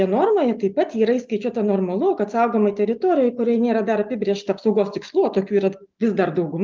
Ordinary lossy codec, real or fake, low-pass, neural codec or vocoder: Opus, 32 kbps; real; 7.2 kHz; none